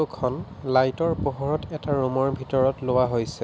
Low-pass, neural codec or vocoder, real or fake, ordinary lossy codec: none; none; real; none